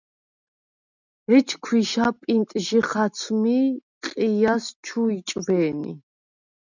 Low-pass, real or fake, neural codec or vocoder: 7.2 kHz; real; none